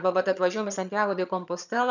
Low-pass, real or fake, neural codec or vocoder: 7.2 kHz; fake; vocoder, 22.05 kHz, 80 mel bands, HiFi-GAN